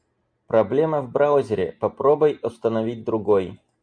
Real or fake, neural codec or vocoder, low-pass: real; none; 9.9 kHz